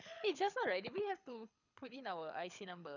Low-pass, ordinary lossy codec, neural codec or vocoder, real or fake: 7.2 kHz; none; codec, 24 kHz, 6 kbps, HILCodec; fake